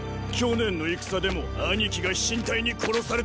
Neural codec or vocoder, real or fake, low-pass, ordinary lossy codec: none; real; none; none